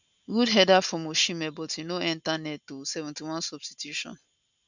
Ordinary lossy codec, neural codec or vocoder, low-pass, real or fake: none; none; 7.2 kHz; real